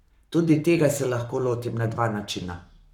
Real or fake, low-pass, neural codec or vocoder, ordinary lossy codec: fake; 19.8 kHz; codec, 44.1 kHz, 7.8 kbps, Pupu-Codec; none